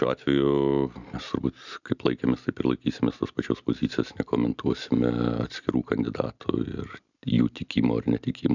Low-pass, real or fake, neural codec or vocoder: 7.2 kHz; real; none